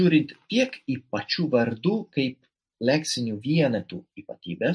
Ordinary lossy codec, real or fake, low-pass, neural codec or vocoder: MP3, 48 kbps; real; 9.9 kHz; none